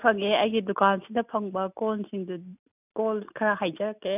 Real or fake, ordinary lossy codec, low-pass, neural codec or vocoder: real; none; 3.6 kHz; none